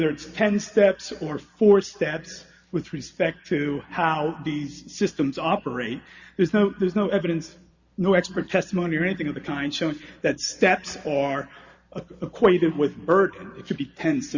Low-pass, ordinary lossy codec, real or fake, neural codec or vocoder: 7.2 kHz; Opus, 64 kbps; real; none